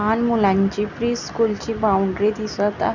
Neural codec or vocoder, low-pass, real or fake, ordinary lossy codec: none; 7.2 kHz; real; none